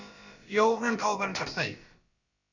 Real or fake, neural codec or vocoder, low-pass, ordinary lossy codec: fake; codec, 16 kHz, about 1 kbps, DyCAST, with the encoder's durations; 7.2 kHz; Opus, 64 kbps